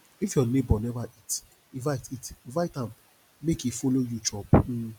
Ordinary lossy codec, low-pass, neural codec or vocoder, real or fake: none; 19.8 kHz; none; real